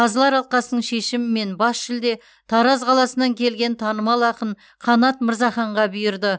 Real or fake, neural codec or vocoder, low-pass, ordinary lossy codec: real; none; none; none